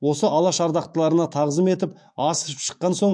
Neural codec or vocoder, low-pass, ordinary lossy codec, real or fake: autoencoder, 48 kHz, 128 numbers a frame, DAC-VAE, trained on Japanese speech; 9.9 kHz; MP3, 64 kbps; fake